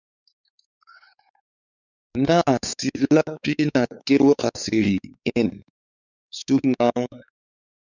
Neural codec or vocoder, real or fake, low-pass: codec, 16 kHz, 4 kbps, X-Codec, WavLM features, trained on Multilingual LibriSpeech; fake; 7.2 kHz